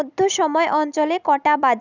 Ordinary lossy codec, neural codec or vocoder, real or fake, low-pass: none; none; real; 7.2 kHz